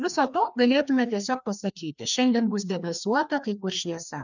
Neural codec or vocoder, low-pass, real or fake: codec, 16 kHz, 2 kbps, FreqCodec, larger model; 7.2 kHz; fake